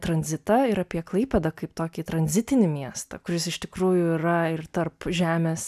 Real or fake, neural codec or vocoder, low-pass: real; none; 14.4 kHz